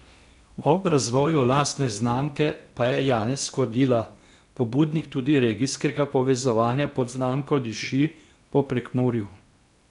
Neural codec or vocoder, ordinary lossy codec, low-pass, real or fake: codec, 16 kHz in and 24 kHz out, 0.8 kbps, FocalCodec, streaming, 65536 codes; none; 10.8 kHz; fake